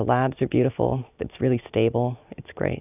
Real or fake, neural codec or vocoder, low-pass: real; none; 3.6 kHz